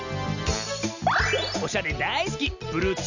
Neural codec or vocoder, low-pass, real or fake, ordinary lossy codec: none; 7.2 kHz; real; none